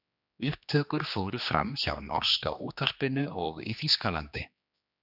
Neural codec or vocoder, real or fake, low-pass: codec, 16 kHz, 2 kbps, X-Codec, HuBERT features, trained on general audio; fake; 5.4 kHz